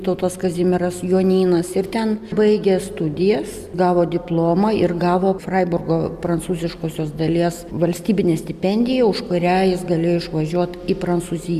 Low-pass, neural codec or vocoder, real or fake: 14.4 kHz; none; real